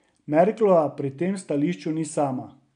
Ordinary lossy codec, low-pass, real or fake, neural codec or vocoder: none; 9.9 kHz; real; none